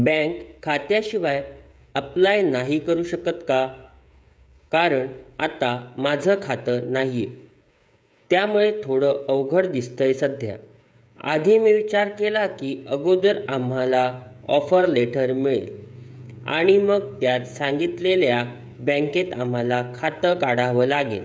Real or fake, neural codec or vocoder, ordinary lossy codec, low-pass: fake; codec, 16 kHz, 16 kbps, FreqCodec, smaller model; none; none